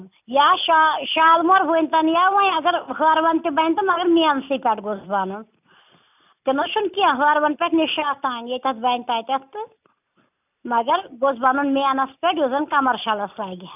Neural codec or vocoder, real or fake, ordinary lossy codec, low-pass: none; real; none; 3.6 kHz